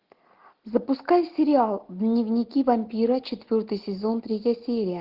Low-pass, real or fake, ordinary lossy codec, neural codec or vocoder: 5.4 kHz; real; Opus, 16 kbps; none